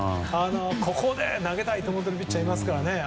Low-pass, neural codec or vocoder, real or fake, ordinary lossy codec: none; none; real; none